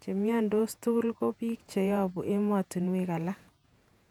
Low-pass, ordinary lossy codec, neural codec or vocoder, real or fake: 19.8 kHz; none; vocoder, 48 kHz, 128 mel bands, Vocos; fake